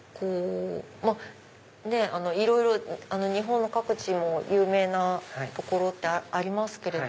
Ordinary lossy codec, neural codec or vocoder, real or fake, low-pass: none; none; real; none